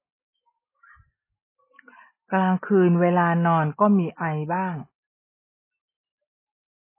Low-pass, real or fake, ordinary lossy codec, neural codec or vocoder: 3.6 kHz; real; MP3, 16 kbps; none